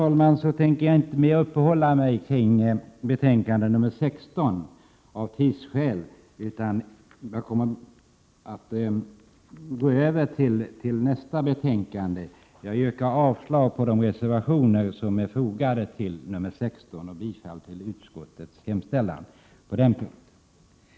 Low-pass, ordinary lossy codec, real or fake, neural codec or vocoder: none; none; real; none